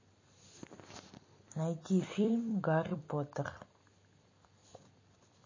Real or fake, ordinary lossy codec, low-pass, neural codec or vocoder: fake; MP3, 32 kbps; 7.2 kHz; vocoder, 44.1 kHz, 128 mel bands every 256 samples, BigVGAN v2